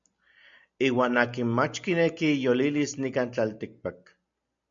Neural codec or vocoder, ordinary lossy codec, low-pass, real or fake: none; MP3, 64 kbps; 7.2 kHz; real